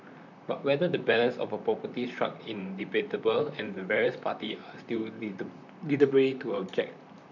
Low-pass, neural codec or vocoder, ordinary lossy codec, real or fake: 7.2 kHz; vocoder, 44.1 kHz, 128 mel bands, Pupu-Vocoder; none; fake